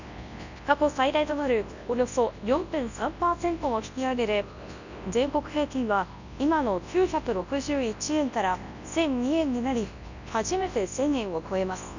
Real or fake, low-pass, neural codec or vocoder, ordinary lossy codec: fake; 7.2 kHz; codec, 24 kHz, 0.9 kbps, WavTokenizer, large speech release; none